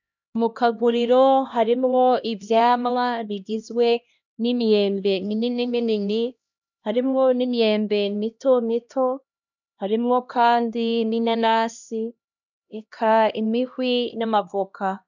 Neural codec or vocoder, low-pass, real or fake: codec, 16 kHz, 1 kbps, X-Codec, HuBERT features, trained on LibriSpeech; 7.2 kHz; fake